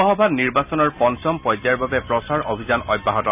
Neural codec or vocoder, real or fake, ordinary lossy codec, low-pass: none; real; none; 3.6 kHz